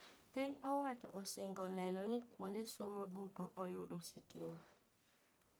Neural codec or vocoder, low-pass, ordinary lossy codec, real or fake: codec, 44.1 kHz, 1.7 kbps, Pupu-Codec; none; none; fake